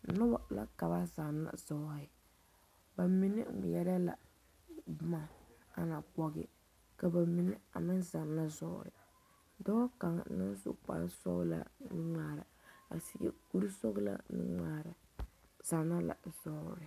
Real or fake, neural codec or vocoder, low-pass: real; none; 14.4 kHz